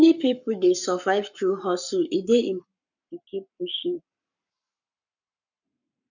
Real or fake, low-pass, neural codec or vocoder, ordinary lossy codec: fake; 7.2 kHz; codec, 44.1 kHz, 7.8 kbps, Pupu-Codec; none